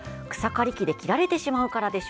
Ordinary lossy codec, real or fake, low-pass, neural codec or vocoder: none; real; none; none